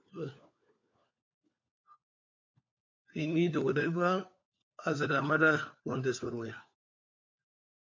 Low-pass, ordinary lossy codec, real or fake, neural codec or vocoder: 7.2 kHz; MP3, 48 kbps; fake; codec, 16 kHz, 4 kbps, FunCodec, trained on LibriTTS, 50 frames a second